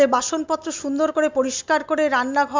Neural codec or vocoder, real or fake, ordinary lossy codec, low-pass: none; real; MP3, 64 kbps; 7.2 kHz